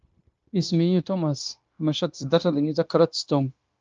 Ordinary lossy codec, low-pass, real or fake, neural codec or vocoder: Opus, 24 kbps; 7.2 kHz; fake; codec, 16 kHz, 0.9 kbps, LongCat-Audio-Codec